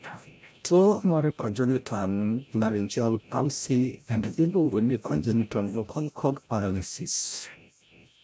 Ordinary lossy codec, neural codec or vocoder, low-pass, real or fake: none; codec, 16 kHz, 0.5 kbps, FreqCodec, larger model; none; fake